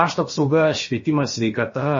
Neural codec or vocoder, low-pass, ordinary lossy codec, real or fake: codec, 16 kHz, about 1 kbps, DyCAST, with the encoder's durations; 7.2 kHz; MP3, 32 kbps; fake